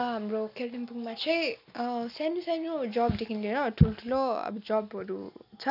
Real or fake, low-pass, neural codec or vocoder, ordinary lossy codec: real; 5.4 kHz; none; none